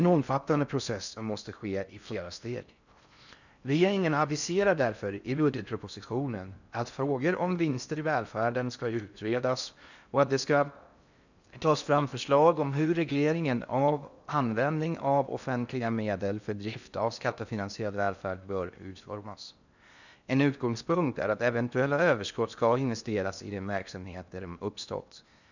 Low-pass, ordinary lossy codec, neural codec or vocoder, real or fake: 7.2 kHz; none; codec, 16 kHz in and 24 kHz out, 0.6 kbps, FocalCodec, streaming, 4096 codes; fake